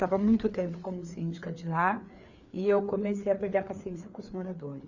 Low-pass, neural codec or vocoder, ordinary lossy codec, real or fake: 7.2 kHz; codec, 16 kHz, 4 kbps, FreqCodec, larger model; none; fake